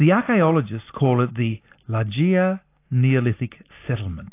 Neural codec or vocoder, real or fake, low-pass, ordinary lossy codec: none; real; 3.6 kHz; AAC, 24 kbps